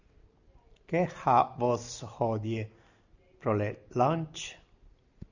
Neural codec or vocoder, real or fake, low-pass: none; real; 7.2 kHz